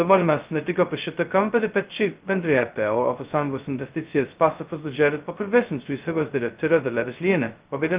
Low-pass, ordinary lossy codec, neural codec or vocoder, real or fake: 3.6 kHz; Opus, 24 kbps; codec, 16 kHz, 0.2 kbps, FocalCodec; fake